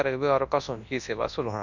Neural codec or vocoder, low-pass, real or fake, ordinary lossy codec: codec, 24 kHz, 0.9 kbps, WavTokenizer, large speech release; 7.2 kHz; fake; none